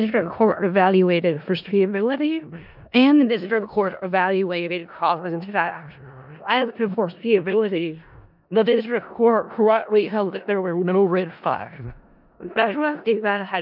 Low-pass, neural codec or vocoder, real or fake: 5.4 kHz; codec, 16 kHz in and 24 kHz out, 0.4 kbps, LongCat-Audio-Codec, four codebook decoder; fake